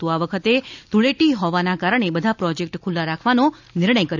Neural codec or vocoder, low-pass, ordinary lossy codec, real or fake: none; 7.2 kHz; none; real